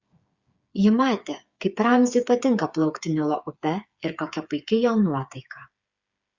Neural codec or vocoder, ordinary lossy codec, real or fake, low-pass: codec, 16 kHz, 8 kbps, FreqCodec, smaller model; Opus, 64 kbps; fake; 7.2 kHz